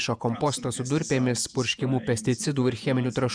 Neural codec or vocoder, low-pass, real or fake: vocoder, 48 kHz, 128 mel bands, Vocos; 9.9 kHz; fake